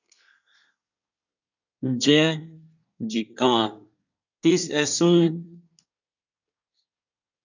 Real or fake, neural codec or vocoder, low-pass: fake; codec, 24 kHz, 1 kbps, SNAC; 7.2 kHz